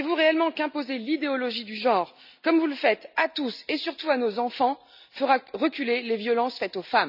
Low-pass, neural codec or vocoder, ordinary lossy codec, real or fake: 5.4 kHz; none; none; real